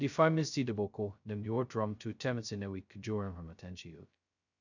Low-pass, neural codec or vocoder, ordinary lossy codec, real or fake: 7.2 kHz; codec, 16 kHz, 0.2 kbps, FocalCodec; none; fake